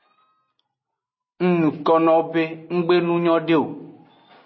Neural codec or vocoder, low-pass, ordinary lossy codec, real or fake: none; 7.2 kHz; MP3, 24 kbps; real